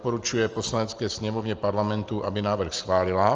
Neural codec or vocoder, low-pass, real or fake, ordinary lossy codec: none; 7.2 kHz; real; Opus, 24 kbps